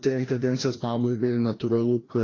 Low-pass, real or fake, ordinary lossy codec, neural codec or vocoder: 7.2 kHz; fake; AAC, 32 kbps; codec, 16 kHz, 1 kbps, FreqCodec, larger model